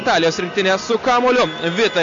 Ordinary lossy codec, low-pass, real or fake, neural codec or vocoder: AAC, 64 kbps; 7.2 kHz; real; none